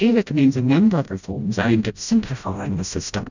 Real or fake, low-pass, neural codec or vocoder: fake; 7.2 kHz; codec, 16 kHz, 0.5 kbps, FreqCodec, smaller model